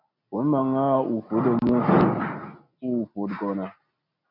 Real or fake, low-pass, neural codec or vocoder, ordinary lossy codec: real; 5.4 kHz; none; MP3, 48 kbps